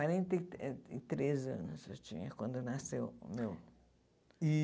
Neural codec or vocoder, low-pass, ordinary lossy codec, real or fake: none; none; none; real